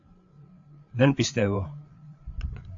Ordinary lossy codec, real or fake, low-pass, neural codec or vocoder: AAC, 48 kbps; fake; 7.2 kHz; codec, 16 kHz, 8 kbps, FreqCodec, larger model